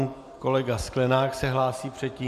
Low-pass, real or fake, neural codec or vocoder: 14.4 kHz; real; none